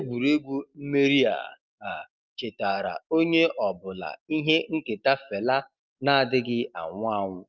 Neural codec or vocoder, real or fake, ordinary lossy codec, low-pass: none; real; Opus, 24 kbps; 7.2 kHz